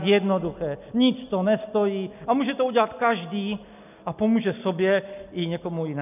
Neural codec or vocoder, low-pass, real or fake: none; 3.6 kHz; real